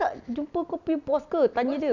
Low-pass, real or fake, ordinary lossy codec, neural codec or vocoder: 7.2 kHz; real; none; none